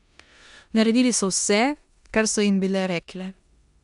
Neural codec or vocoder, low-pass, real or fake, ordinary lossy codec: codec, 16 kHz in and 24 kHz out, 0.9 kbps, LongCat-Audio-Codec, four codebook decoder; 10.8 kHz; fake; none